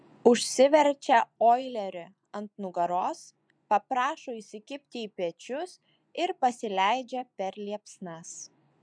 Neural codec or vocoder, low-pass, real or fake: vocoder, 24 kHz, 100 mel bands, Vocos; 9.9 kHz; fake